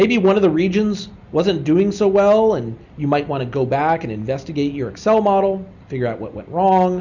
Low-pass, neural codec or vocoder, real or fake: 7.2 kHz; none; real